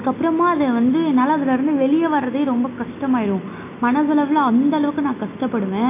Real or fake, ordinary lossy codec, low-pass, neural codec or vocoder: real; AAC, 24 kbps; 3.6 kHz; none